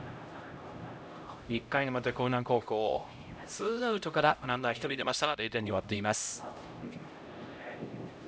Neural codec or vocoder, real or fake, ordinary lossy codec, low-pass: codec, 16 kHz, 0.5 kbps, X-Codec, HuBERT features, trained on LibriSpeech; fake; none; none